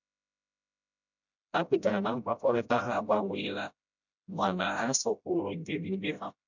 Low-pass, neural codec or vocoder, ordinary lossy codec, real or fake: 7.2 kHz; codec, 16 kHz, 0.5 kbps, FreqCodec, smaller model; none; fake